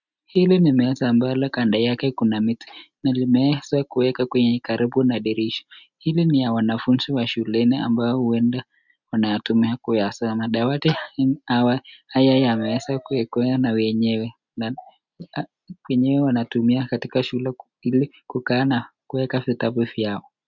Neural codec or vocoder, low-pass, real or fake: none; 7.2 kHz; real